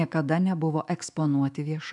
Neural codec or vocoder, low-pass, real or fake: autoencoder, 48 kHz, 128 numbers a frame, DAC-VAE, trained on Japanese speech; 10.8 kHz; fake